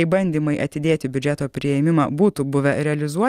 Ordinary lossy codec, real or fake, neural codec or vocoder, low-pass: Opus, 32 kbps; real; none; 14.4 kHz